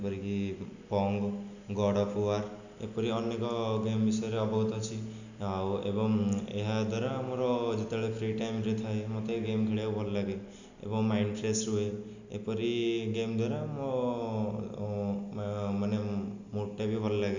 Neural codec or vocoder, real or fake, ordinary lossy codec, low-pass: none; real; none; 7.2 kHz